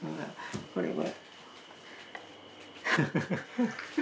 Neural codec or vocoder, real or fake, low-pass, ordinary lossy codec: none; real; none; none